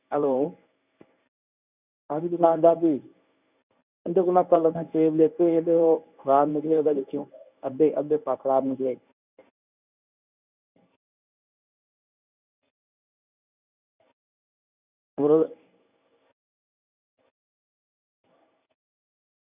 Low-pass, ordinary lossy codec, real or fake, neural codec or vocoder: 3.6 kHz; none; fake; codec, 24 kHz, 0.9 kbps, WavTokenizer, medium speech release version 1